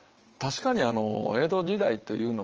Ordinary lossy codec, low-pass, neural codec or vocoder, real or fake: Opus, 24 kbps; 7.2 kHz; vocoder, 22.05 kHz, 80 mel bands, WaveNeXt; fake